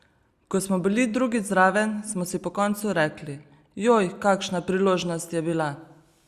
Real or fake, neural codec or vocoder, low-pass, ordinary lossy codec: real; none; 14.4 kHz; Opus, 64 kbps